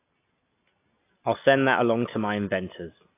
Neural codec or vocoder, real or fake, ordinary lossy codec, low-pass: none; real; AAC, 24 kbps; 3.6 kHz